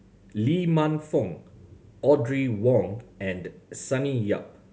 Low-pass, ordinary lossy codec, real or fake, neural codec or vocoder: none; none; real; none